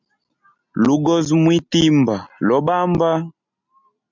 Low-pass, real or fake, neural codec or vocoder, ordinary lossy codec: 7.2 kHz; real; none; MP3, 64 kbps